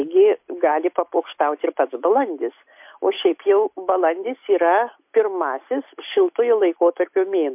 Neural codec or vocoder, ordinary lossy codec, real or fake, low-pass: none; MP3, 32 kbps; real; 3.6 kHz